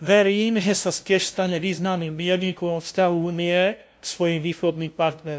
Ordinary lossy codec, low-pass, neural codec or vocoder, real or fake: none; none; codec, 16 kHz, 0.5 kbps, FunCodec, trained on LibriTTS, 25 frames a second; fake